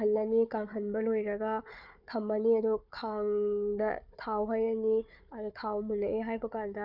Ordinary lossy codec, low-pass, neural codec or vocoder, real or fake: none; 5.4 kHz; codec, 16 kHz, 4 kbps, FunCodec, trained on Chinese and English, 50 frames a second; fake